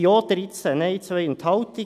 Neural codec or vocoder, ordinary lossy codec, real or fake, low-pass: none; none; real; 14.4 kHz